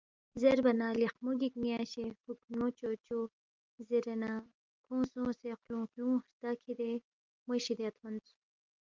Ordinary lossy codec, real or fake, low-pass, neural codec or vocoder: Opus, 24 kbps; real; 7.2 kHz; none